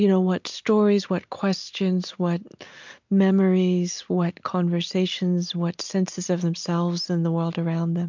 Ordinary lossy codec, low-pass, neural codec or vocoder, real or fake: MP3, 64 kbps; 7.2 kHz; none; real